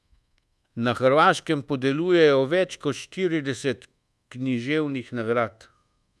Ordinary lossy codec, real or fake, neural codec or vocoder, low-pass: none; fake; codec, 24 kHz, 1.2 kbps, DualCodec; none